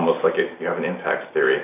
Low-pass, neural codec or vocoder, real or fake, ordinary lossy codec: 3.6 kHz; none; real; AAC, 24 kbps